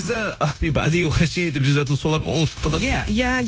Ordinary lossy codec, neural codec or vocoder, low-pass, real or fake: none; codec, 16 kHz, 0.9 kbps, LongCat-Audio-Codec; none; fake